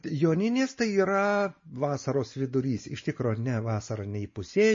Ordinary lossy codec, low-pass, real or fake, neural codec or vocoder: MP3, 32 kbps; 7.2 kHz; real; none